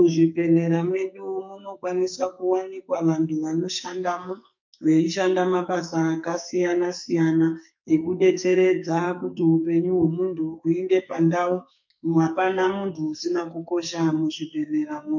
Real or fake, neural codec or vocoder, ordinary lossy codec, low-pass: fake; codec, 44.1 kHz, 2.6 kbps, SNAC; MP3, 48 kbps; 7.2 kHz